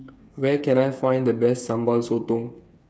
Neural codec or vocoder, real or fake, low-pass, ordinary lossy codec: codec, 16 kHz, 8 kbps, FreqCodec, smaller model; fake; none; none